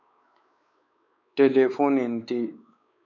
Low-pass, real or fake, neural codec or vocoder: 7.2 kHz; fake; codec, 16 kHz, 4 kbps, X-Codec, WavLM features, trained on Multilingual LibriSpeech